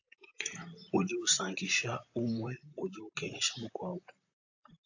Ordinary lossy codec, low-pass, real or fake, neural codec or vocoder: AAC, 48 kbps; 7.2 kHz; fake; vocoder, 44.1 kHz, 128 mel bands, Pupu-Vocoder